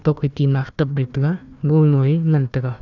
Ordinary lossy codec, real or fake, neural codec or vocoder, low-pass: none; fake; codec, 16 kHz, 1 kbps, FunCodec, trained on Chinese and English, 50 frames a second; 7.2 kHz